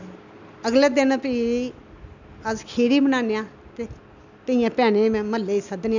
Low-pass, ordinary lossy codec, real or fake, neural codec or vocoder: 7.2 kHz; none; real; none